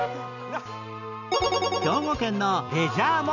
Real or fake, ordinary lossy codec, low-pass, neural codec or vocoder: real; none; 7.2 kHz; none